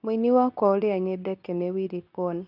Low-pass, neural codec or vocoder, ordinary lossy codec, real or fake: 5.4 kHz; codec, 24 kHz, 0.9 kbps, WavTokenizer, medium speech release version 2; none; fake